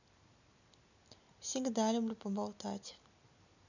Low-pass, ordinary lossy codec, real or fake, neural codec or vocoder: 7.2 kHz; none; real; none